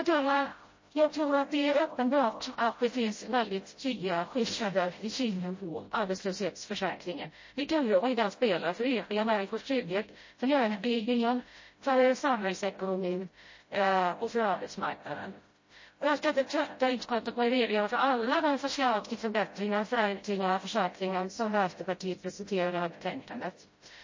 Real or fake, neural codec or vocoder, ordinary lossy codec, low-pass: fake; codec, 16 kHz, 0.5 kbps, FreqCodec, smaller model; MP3, 32 kbps; 7.2 kHz